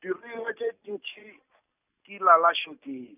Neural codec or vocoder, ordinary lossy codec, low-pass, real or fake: none; none; 3.6 kHz; real